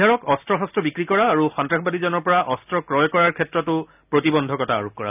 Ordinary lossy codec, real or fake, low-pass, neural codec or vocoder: none; real; 3.6 kHz; none